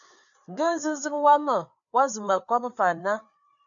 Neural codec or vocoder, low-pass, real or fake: codec, 16 kHz, 4 kbps, FreqCodec, larger model; 7.2 kHz; fake